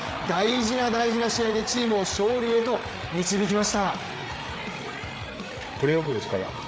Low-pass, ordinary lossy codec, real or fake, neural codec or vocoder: none; none; fake; codec, 16 kHz, 8 kbps, FreqCodec, larger model